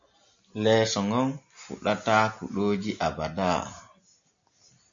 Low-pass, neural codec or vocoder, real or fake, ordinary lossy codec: 7.2 kHz; none; real; AAC, 64 kbps